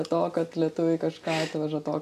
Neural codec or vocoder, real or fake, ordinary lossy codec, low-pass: none; real; AAC, 96 kbps; 14.4 kHz